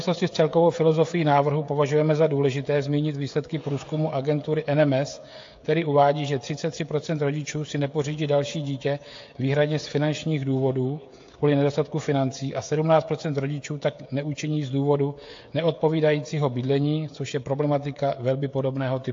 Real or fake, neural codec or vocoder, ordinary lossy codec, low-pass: fake; codec, 16 kHz, 16 kbps, FreqCodec, smaller model; AAC, 48 kbps; 7.2 kHz